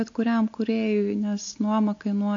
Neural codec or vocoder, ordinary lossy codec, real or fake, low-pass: none; AAC, 48 kbps; real; 7.2 kHz